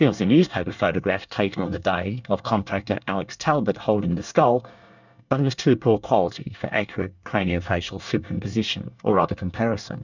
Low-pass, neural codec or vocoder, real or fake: 7.2 kHz; codec, 24 kHz, 1 kbps, SNAC; fake